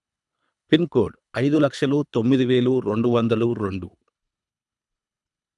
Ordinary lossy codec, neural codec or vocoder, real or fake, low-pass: none; codec, 24 kHz, 3 kbps, HILCodec; fake; 10.8 kHz